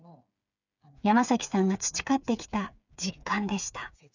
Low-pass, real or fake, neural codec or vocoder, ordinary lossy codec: 7.2 kHz; fake; codec, 16 kHz, 8 kbps, FreqCodec, smaller model; none